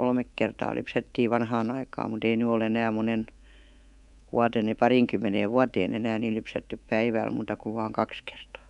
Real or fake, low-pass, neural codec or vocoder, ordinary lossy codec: fake; 10.8 kHz; codec, 24 kHz, 3.1 kbps, DualCodec; none